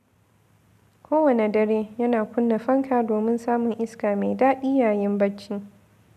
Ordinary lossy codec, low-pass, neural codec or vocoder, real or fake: none; 14.4 kHz; none; real